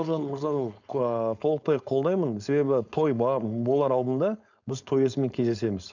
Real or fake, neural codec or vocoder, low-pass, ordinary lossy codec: fake; codec, 16 kHz, 4.8 kbps, FACodec; 7.2 kHz; none